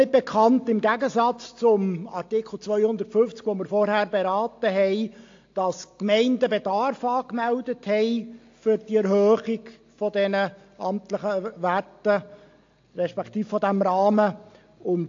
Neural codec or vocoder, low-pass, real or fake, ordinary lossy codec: none; 7.2 kHz; real; AAC, 48 kbps